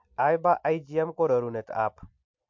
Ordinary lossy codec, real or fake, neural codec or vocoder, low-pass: MP3, 48 kbps; real; none; 7.2 kHz